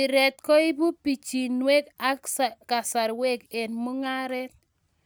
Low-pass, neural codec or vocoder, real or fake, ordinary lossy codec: none; none; real; none